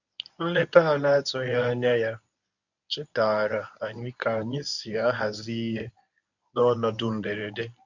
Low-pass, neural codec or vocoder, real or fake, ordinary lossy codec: 7.2 kHz; codec, 24 kHz, 0.9 kbps, WavTokenizer, medium speech release version 1; fake; none